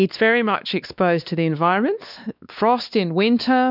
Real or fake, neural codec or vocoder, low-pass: fake; codec, 16 kHz, 2 kbps, X-Codec, WavLM features, trained on Multilingual LibriSpeech; 5.4 kHz